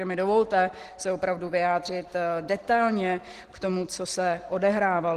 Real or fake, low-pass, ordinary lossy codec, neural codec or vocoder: real; 14.4 kHz; Opus, 16 kbps; none